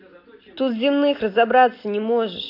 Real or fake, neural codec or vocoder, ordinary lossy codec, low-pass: real; none; AAC, 32 kbps; 5.4 kHz